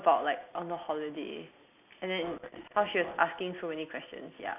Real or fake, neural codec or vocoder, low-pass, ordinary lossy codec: real; none; 3.6 kHz; none